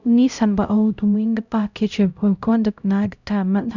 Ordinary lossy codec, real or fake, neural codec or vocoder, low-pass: none; fake; codec, 16 kHz, 0.5 kbps, X-Codec, HuBERT features, trained on LibriSpeech; 7.2 kHz